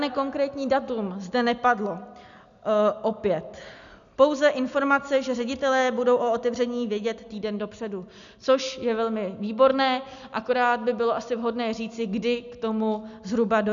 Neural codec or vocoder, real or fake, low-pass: none; real; 7.2 kHz